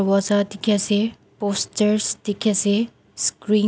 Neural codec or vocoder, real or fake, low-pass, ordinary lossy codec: none; real; none; none